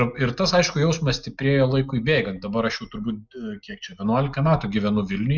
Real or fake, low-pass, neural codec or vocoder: real; 7.2 kHz; none